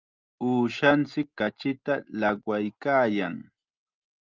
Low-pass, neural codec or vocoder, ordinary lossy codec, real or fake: 7.2 kHz; none; Opus, 24 kbps; real